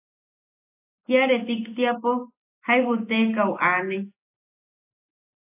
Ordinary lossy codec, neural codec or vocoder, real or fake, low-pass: AAC, 32 kbps; none; real; 3.6 kHz